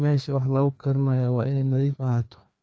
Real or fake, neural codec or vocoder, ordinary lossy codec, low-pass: fake; codec, 16 kHz, 2 kbps, FreqCodec, larger model; none; none